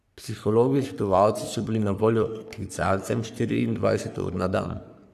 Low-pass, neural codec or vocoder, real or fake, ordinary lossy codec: 14.4 kHz; codec, 44.1 kHz, 3.4 kbps, Pupu-Codec; fake; none